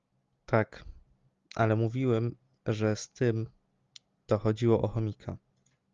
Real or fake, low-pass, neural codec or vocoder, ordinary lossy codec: real; 7.2 kHz; none; Opus, 32 kbps